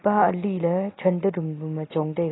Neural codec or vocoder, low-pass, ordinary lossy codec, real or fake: none; 7.2 kHz; AAC, 16 kbps; real